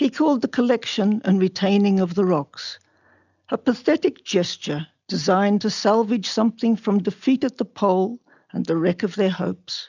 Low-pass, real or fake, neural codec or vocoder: 7.2 kHz; real; none